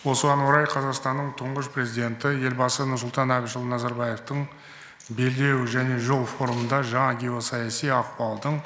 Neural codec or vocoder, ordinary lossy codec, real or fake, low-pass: none; none; real; none